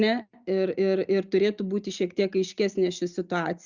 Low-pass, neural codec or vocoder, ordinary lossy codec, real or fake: 7.2 kHz; none; Opus, 64 kbps; real